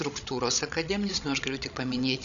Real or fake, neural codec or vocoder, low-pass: fake; codec, 16 kHz, 16 kbps, FreqCodec, larger model; 7.2 kHz